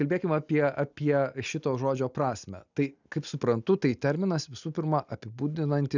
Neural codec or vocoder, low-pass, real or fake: none; 7.2 kHz; real